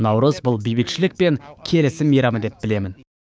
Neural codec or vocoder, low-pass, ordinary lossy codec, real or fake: codec, 16 kHz, 6 kbps, DAC; none; none; fake